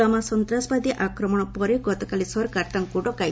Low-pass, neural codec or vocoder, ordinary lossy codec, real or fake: none; none; none; real